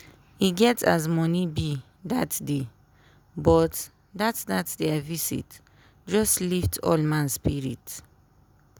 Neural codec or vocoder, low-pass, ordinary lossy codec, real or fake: none; none; none; real